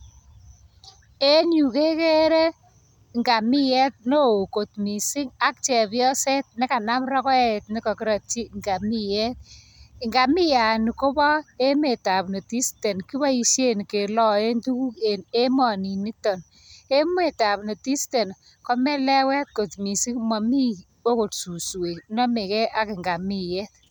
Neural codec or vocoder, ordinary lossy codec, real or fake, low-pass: none; none; real; none